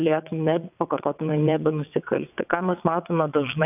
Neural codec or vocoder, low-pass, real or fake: vocoder, 22.05 kHz, 80 mel bands, WaveNeXt; 3.6 kHz; fake